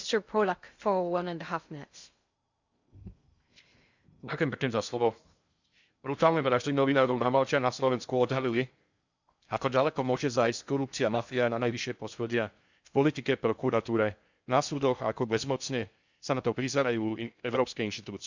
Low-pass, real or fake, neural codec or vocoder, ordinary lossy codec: 7.2 kHz; fake; codec, 16 kHz in and 24 kHz out, 0.6 kbps, FocalCodec, streaming, 2048 codes; Opus, 64 kbps